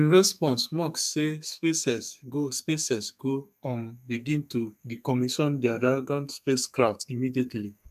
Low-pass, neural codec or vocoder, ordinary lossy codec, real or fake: 14.4 kHz; codec, 32 kHz, 1.9 kbps, SNAC; none; fake